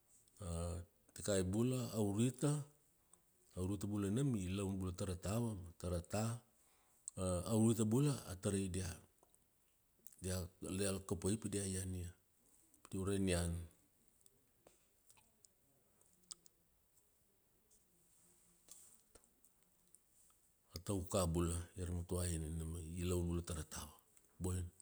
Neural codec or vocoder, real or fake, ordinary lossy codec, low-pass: none; real; none; none